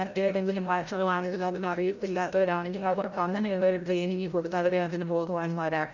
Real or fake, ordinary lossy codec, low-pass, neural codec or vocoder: fake; none; 7.2 kHz; codec, 16 kHz, 0.5 kbps, FreqCodec, larger model